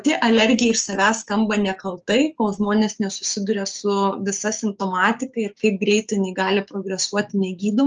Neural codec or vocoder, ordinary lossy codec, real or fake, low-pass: codec, 16 kHz, 6 kbps, DAC; Opus, 24 kbps; fake; 7.2 kHz